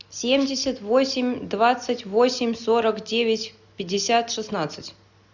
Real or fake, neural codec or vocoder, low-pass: real; none; 7.2 kHz